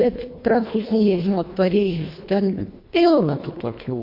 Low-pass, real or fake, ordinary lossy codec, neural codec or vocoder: 5.4 kHz; fake; MP3, 32 kbps; codec, 24 kHz, 1.5 kbps, HILCodec